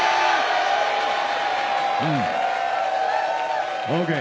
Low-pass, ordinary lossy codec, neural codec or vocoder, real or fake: none; none; none; real